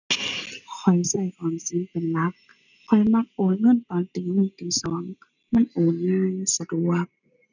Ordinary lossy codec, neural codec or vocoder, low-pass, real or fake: none; none; 7.2 kHz; real